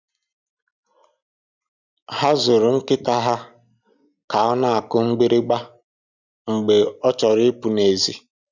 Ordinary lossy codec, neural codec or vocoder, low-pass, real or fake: none; none; 7.2 kHz; real